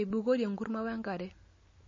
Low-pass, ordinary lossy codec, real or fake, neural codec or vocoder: 7.2 kHz; MP3, 32 kbps; real; none